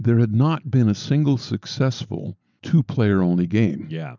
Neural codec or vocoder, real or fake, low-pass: none; real; 7.2 kHz